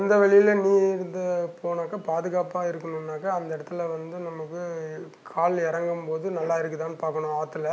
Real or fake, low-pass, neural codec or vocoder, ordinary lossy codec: real; none; none; none